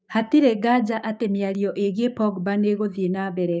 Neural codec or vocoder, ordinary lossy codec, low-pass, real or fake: codec, 16 kHz, 6 kbps, DAC; none; none; fake